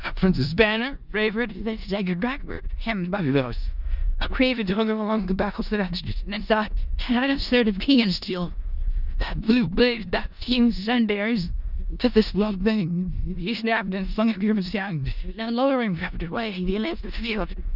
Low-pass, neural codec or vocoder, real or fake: 5.4 kHz; codec, 16 kHz in and 24 kHz out, 0.4 kbps, LongCat-Audio-Codec, four codebook decoder; fake